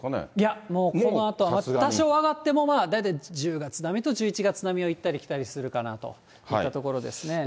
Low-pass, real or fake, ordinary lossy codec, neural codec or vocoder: none; real; none; none